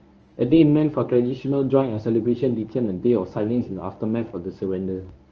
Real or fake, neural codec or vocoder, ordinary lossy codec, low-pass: fake; codec, 24 kHz, 0.9 kbps, WavTokenizer, medium speech release version 1; Opus, 24 kbps; 7.2 kHz